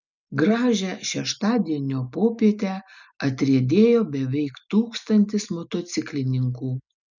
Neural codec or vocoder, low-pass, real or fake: none; 7.2 kHz; real